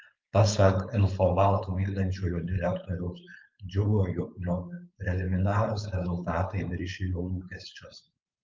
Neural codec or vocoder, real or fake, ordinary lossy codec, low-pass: codec, 16 kHz, 4.8 kbps, FACodec; fake; Opus, 24 kbps; 7.2 kHz